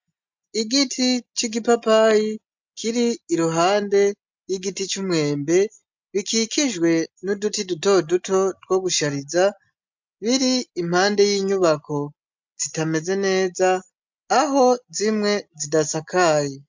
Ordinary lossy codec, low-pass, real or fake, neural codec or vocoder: MP3, 64 kbps; 7.2 kHz; real; none